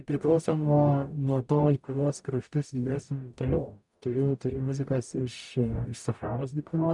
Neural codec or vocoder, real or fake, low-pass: codec, 44.1 kHz, 0.9 kbps, DAC; fake; 10.8 kHz